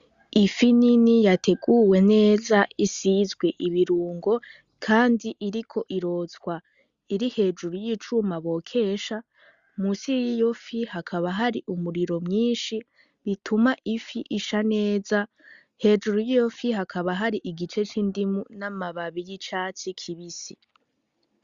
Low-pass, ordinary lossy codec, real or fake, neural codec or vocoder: 7.2 kHz; Opus, 64 kbps; real; none